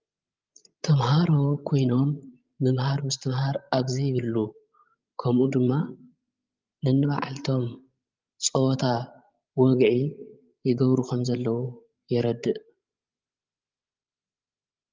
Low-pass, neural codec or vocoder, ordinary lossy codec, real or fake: 7.2 kHz; codec, 16 kHz, 16 kbps, FreqCodec, larger model; Opus, 32 kbps; fake